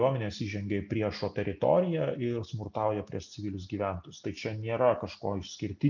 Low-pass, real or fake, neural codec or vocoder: 7.2 kHz; real; none